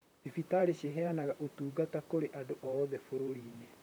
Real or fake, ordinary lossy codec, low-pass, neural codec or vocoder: fake; none; none; vocoder, 44.1 kHz, 128 mel bands, Pupu-Vocoder